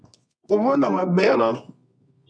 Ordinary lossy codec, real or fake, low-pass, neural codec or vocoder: MP3, 64 kbps; fake; 9.9 kHz; codec, 24 kHz, 0.9 kbps, WavTokenizer, medium music audio release